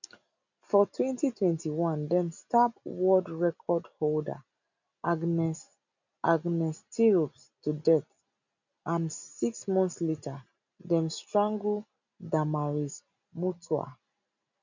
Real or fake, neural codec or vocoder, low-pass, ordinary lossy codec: real; none; 7.2 kHz; none